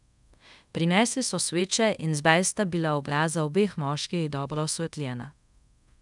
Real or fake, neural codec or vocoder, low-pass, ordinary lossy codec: fake; codec, 24 kHz, 0.5 kbps, DualCodec; 10.8 kHz; none